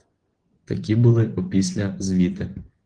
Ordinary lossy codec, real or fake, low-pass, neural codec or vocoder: Opus, 16 kbps; fake; 9.9 kHz; vocoder, 24 kHz, 100 mel bands, Vocos